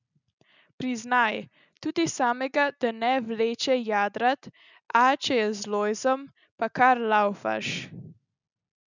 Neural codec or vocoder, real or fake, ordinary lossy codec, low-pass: none; real; none; 7.2 kHz